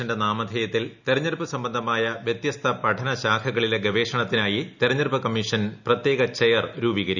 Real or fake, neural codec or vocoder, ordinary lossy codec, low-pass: real; none; none; 7.2 kHz